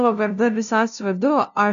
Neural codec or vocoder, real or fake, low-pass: codec, 16 kHz, 0.5 kbps, FunCodec, trained on LibriTTS, 25 frames a second; fake; 7.2 kHz